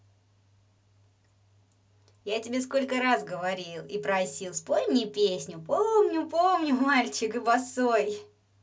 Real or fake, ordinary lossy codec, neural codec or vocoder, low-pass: real; none; none; none